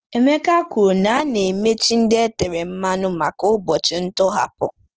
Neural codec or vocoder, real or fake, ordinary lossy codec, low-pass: none; real; Opus, 16 kbps; 7.2 kHz